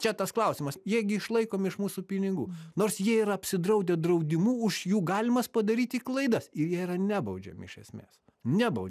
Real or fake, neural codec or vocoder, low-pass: real; none; 14.4 kHz